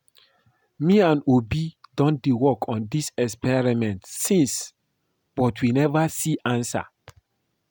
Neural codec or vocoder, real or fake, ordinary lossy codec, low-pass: none; real; none; none